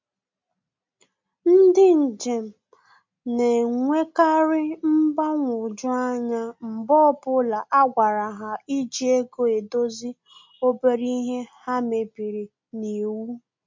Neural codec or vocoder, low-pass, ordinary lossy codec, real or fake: none; 7.2 kHz; MP3, 48 kbps; real